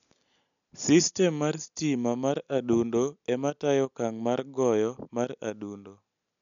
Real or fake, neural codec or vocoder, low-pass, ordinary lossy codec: real; none; 7.2 kHz; none